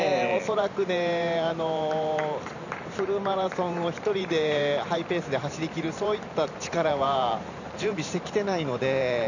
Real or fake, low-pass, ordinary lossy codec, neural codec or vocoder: fake; 7.2 kHz; none; vocoder, 44.1 kHz, 128 mel bands every 512 samples, BigVGAN v2